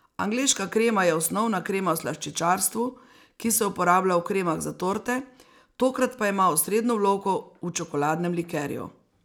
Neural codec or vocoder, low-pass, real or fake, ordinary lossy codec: none; none; real; none